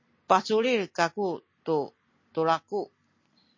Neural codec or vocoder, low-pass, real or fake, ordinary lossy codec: none; 7.2 kHz; real; MP3, 32 kbps